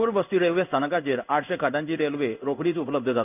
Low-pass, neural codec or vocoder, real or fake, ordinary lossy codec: 3.6 kHz; codec, 16 kHz in and 24 kHz out, 1 kbps, XY-Tokenizer; fake; none